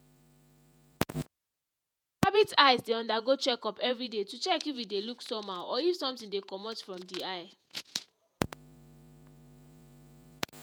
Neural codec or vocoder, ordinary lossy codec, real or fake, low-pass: vocoder, 48 kHz, 128 mel bands, Vocos; none; fake; none